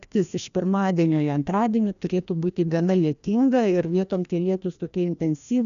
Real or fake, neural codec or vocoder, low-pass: fake; codec, 16 kHz, 1 kbps, FreqCodec, larger model; 7.2 kHz